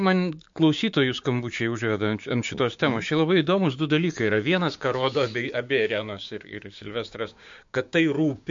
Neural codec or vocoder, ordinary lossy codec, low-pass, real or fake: codec, 16 kHz, 6 kbps, DAC; MP3, 48 kbps; 7.2 kHz; fake